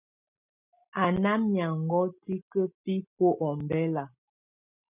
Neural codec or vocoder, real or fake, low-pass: none; real; 3.6 kHz